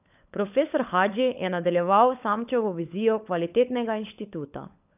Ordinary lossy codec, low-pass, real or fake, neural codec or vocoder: none; 3.6 kHz; fake; codec, 16 kHz, 16 kbps, FunCodec, trained on LibriTTS, 50 frames a second